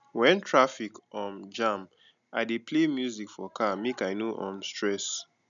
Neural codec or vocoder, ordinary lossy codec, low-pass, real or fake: none; none; 7.2 kHz; real